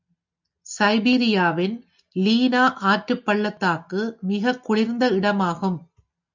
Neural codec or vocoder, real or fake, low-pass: none; real; 7.2 kHz